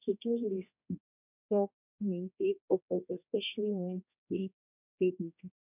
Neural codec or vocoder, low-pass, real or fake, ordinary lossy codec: codec, 16 kHz, 1 kbps, X-Codec, HuBERT features, trained on general audio; 3.6 kHz; fake; none